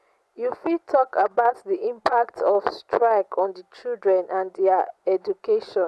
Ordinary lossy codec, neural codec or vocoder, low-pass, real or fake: none; vocoder, 24 kHz, 100 mel bands, Vocos; none; fake